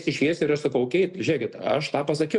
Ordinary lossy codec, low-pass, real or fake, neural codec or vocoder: MP3, 96 kbps; 10.8 kHz; real; none